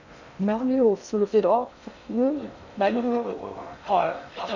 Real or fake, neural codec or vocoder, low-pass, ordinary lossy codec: fake; codec, 16 kHz in and 24 kHz out, 0.6 kbps, FocalCodec, streaming, 2048 codes; 7.2 kHz; none